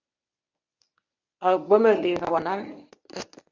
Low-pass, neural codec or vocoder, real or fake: 7.2 kHz; codec, 24 kHz, 0.9 kbps, WavTokenizer, medium speech release version 1; fake